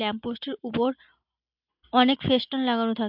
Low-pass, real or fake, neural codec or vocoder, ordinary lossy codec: 5.4 kHz; real; none; MP3, 48 kbps